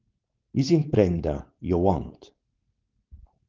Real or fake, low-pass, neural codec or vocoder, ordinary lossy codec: fake; 7.2 kHz; codec, 16 kHz, 4.8 kbps, FACodec; Opus, 16 kbps